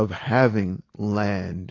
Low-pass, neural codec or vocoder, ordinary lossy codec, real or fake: 7.2 kHz; none; AAC, 32 kbps; real